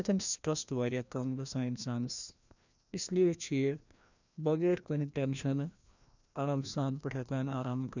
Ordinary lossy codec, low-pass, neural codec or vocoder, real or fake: none; 7.2 kHz; codec, 16 kHz, 1 kbps, FreqCodec, larger model; fake